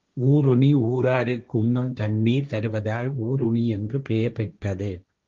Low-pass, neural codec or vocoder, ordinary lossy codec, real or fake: 7.2 kHz; codec, 16 kHz, 1.1 kbps, Voila-Tokenizer; Opus, 32 kbps; fake